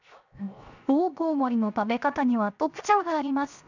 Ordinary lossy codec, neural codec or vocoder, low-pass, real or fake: none; codec, 16 kHz, 0.7 kbps, FocalCodec; 7.2 kHz; fake